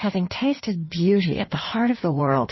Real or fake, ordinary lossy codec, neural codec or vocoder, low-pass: fake; MP3, 24 kbps; codec, 16 kHz in and 24 kHz out, 1.1 kbps, FireRedTTS-2 codec; 7.2 kHz